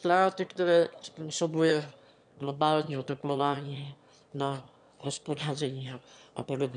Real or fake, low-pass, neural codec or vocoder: fake; 9.9 kHz; autoencoder, 22.05 kHz, a latent of 192 numbers a frame, VITS, trained on one speaker